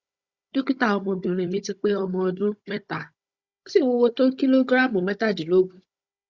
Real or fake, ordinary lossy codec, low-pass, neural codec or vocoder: fake; Opus, 64 kbps; 7.2 kHz; codec, 16 kHz, 16 kbps, FunCodec, trained on Chinese and English, 50 frames a second